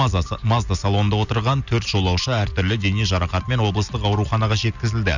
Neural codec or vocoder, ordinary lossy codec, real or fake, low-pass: none; none; real; 7.2 kHz